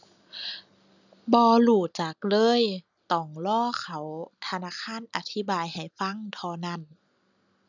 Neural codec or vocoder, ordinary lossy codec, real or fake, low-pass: none; none; real; 7.2 kHz